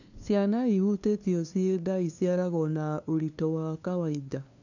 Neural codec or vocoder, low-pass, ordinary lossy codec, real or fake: codec, 16 kHz, 2 kbps, FunCodec, trained on LibriTTS, 25 frames a second; 7.2 kHz; none; fake